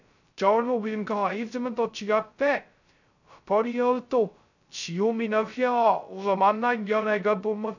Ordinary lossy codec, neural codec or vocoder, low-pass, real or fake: none; codec, 16 kHz, 0.2 kbps, FocalCodec; 7.2 kHz; fake